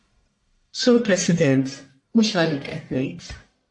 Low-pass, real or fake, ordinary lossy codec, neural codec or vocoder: 10.8 kHz; fake; AAC, 64 kbps; codec, 44.1 kHz, 1.7 kbps, Pupu-Codec